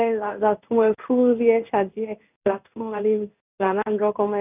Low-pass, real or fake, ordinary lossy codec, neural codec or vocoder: 3.6 kHz; fake; none; codec, 16 kHz in and 24 kHz out, 1 kbps, XY-Tokenizer